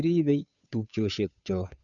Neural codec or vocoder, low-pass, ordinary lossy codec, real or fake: codec, 16 kHz, 2 kbps, FunCodec, trained on Chinese and English, 25 frames a second; 7.2 kHz; none; fake